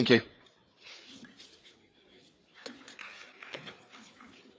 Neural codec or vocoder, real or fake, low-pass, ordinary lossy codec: codec, 16 kHz, 4 kbps, FreqCodec, larger model; fake; none; none